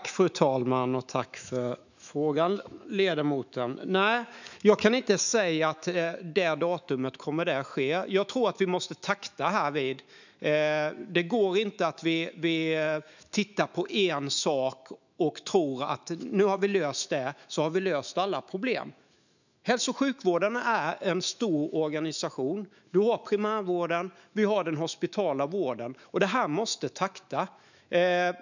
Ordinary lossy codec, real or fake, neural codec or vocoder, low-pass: none; fake; autoencoder, 48 kHz, 128 numbers a frame, DAC-VAE, trained on Japanese speech; 7.2 kHz